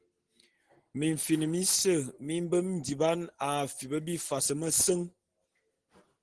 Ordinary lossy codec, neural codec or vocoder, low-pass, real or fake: Opus, 16 kbps; none; 9.9 kHz; real